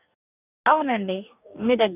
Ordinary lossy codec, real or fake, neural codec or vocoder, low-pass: none; fake; codec, 44.1 kHz, 2.6 kbps, DAC; 3.6 kHz